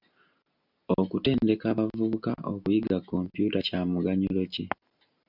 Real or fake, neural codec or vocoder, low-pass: real; none; 5.4 kHz